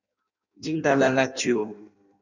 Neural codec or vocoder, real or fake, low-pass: codec, 16 kHz in and 24 kHz out, 0.6 kbps, FireRedTTS-2 codec; fake; 7.2 kHz